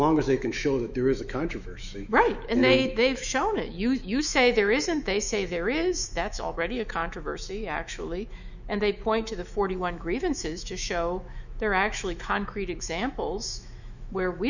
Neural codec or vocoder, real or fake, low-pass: autoencoder, 48 kHz, 128 numbers a frame, DAC-VAE, trained on Japanese speech; fake; 7.2 kHz